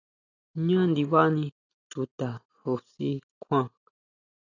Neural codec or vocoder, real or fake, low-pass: none; real; 7.2 kHz